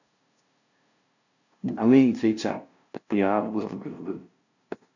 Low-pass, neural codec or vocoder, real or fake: 7.2 kHz; codec, 16 kHz, 0.5 kbps, FunCodec, trained on LibriTTS, 25 frames a second; fake